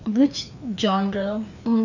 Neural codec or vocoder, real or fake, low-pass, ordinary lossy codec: codec, 16 kHz, 2 kbps, FreqCodec, larger model; fake; 7.2 kHz; none